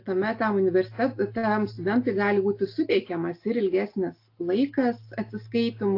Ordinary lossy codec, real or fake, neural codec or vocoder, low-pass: AAC, 32 kbps; real; none; 5.4 kHz